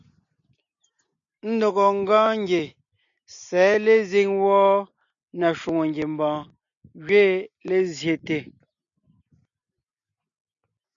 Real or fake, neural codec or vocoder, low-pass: real; none; 7.2 kHz